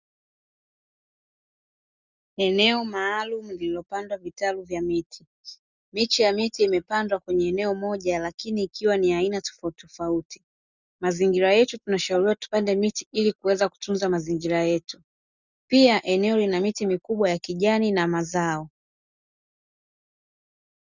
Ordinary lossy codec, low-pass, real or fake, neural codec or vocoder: Opus, 64 kbps; 7.2 kHz; real; none